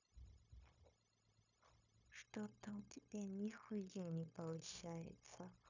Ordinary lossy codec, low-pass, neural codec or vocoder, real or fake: none; 7.2 kHz; codec, 16 kHz, 0.9 kbps, LongCat-Audio-Codec; fake